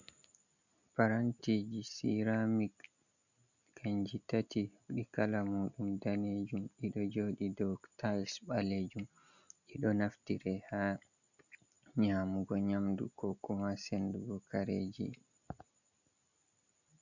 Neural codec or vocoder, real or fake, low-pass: none; real; 7.2 kHz